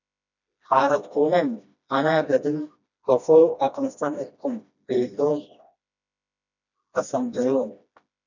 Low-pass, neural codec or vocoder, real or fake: 7.2 kHz; codec, 16 kHz, 1 kbps, FreqCodec, smaller model; fake